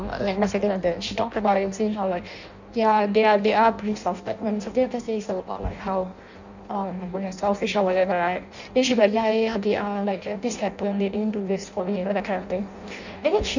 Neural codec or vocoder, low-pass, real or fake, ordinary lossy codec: codec, 16 kHz in and 24 kHz out, 0.6 kbps, FireRedTTS-2 codec; 7.2 kHz; fake; none